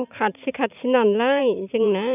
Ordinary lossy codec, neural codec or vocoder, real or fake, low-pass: none; vocoder, 44.1 kHz, 128 mel bands every 512 samples, BigVGAN v2; fake; 3.6 kHz